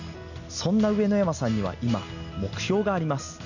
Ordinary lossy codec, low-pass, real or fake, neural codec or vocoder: none; 7.2 kHz; real; none